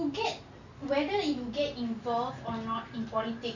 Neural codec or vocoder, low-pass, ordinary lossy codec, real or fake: none; 7.2 kHz; none; real